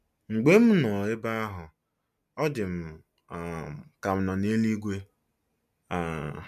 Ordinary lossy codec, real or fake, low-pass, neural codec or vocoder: MP3, 96 kbps; real; 14.4 kHz; none